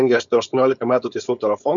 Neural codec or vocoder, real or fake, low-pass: codec, 16 kHz, 4.8 kbps, FACodec; fake; 7.2 kHz